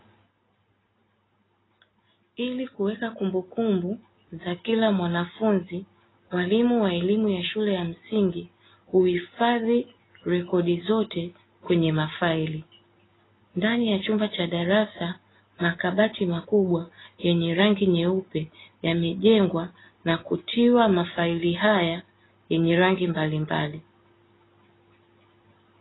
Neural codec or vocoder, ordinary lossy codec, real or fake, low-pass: none; AAC, 16 kbps; real; 7.2 kHz